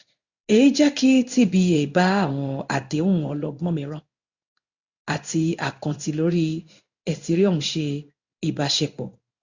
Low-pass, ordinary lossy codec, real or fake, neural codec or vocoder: 7.2 kHz; Opus, 64 kbps; fake; codec, 16 kHz in and 24 kHz out, 1 kbps, XY-Tokenizer